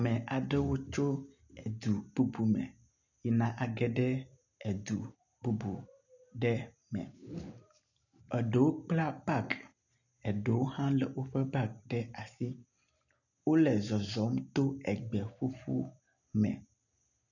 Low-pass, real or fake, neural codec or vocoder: 7.2 kHz; real; none